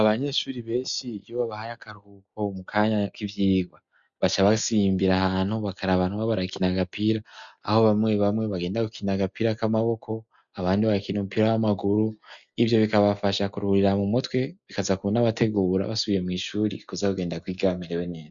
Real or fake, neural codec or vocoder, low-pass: real; none; 7.2 kHz